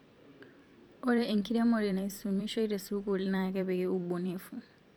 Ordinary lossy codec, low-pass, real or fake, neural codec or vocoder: none; none; fake; vocoder, 44.1 kHz, 128 mel bands every 512 samples, BigVGAN v2